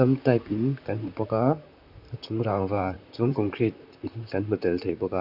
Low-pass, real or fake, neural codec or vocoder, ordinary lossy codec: 5.4 kHz; fake; vocoder, 44.1 kHz, 128 mel bands, Pupu-Vocoder; none